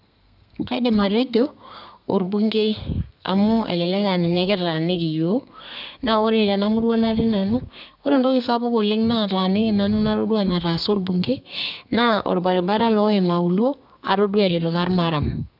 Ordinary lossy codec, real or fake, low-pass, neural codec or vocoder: none; fake; 5.4 kHz; codec, 32 kHz, 1.9 kbps, SNAC